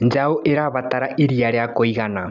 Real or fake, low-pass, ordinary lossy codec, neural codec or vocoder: real; 7.2 kHz; none; none